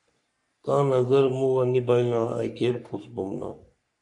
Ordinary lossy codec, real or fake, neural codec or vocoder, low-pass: MP3, 64 kbps; fake; codec, 44.1 kHz, 3.4 kbps, Pupu-Codec; 10.8 kHz